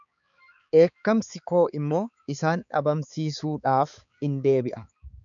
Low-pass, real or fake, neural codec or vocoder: 7.2 kHz; fake; codec, 16 kHz, 4 kbps, X-Codec, HuBERT features, trained on balanced general audio